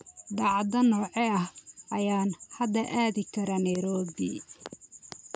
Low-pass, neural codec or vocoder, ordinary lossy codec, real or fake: none; none; none; real